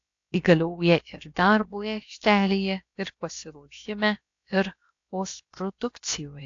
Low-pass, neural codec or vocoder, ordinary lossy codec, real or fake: 7.2 kHz; codec, 16 kHz, about 1 kbps, DyCAST, with the encoder's durations; AAC, 48 kbps; fake